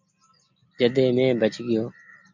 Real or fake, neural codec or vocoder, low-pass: real; none; 7.2 kHz